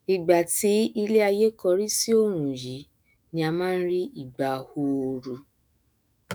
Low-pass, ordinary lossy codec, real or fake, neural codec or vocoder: none; none; fake; autoencoder, 48 kHz, 128 numbers a frame, DAC-VAE, trained on Japanese speech